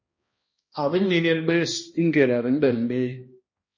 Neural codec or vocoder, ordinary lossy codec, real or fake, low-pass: codec, 16 kHz, 1 kbps, X-Codec, HuBERT features, trained on balanced general audio; MP3, 32 kbps; fake; 7.2 kHz